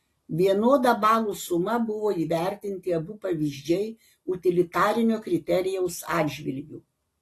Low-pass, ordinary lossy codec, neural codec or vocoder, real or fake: 14.4 kHz; AAC, 48 kbps; none; real